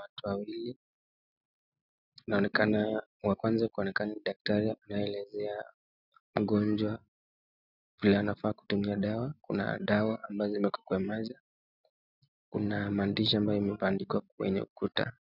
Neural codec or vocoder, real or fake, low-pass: none; real; 5.4 kHz